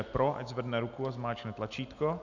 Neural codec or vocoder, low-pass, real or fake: none; 7.2 kHz; real